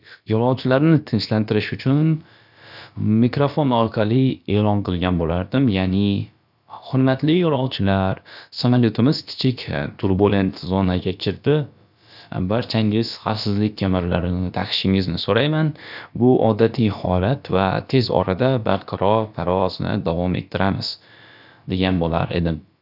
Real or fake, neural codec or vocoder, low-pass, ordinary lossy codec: fake; codec, 16 kHz, about 1 kbps, DyCAST, with the encoder's durations; 5.4 kHz; none